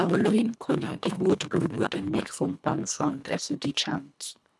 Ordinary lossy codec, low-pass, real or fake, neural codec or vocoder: none; none; fake; codec, 24 kHz, 1.5 kbps, HILCodec